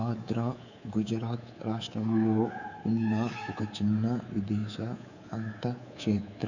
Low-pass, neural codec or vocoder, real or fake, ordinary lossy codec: 7.2 kHz; codec, 24 kHz, 3.1 kbps, DualCodec; fake; none